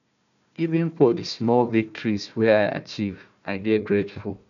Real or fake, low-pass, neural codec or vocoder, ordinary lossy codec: fake; 7.2 kHz; codec, 16 kHz, 1 kbps, FunCodec, trained on Chinese and English, 50 frames a second; none